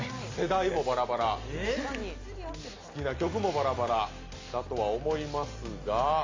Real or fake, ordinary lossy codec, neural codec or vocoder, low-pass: real; AAC, 32 kbps; none; 7.2 kHz